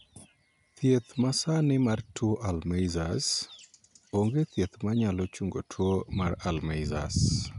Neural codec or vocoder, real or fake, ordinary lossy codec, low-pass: none; real; none; 10.8 kHz